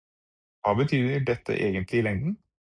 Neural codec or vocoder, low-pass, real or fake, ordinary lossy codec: none; 9.9 kHz; real; AAC, 64 kbps